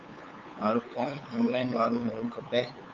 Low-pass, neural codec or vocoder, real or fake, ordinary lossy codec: 7.2 kHz; codec, 16 kHz, 8 kbps, FunCodec, trained on LibriTTS, 25 frames a second; fake; Opus, 24 kbps